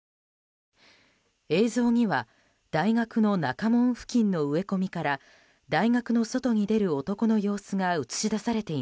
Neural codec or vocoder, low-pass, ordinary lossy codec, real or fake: none; none; none; real